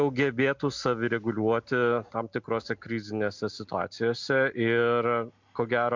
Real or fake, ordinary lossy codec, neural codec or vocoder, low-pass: real; MP3, 64 kbps; none; 7.2 kHz